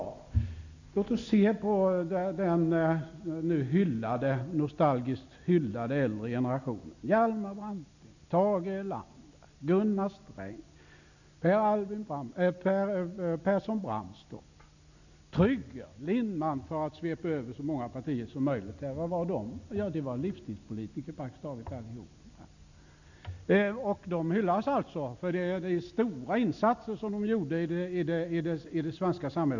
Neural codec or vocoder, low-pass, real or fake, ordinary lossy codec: none; 7.2 kHz; real; none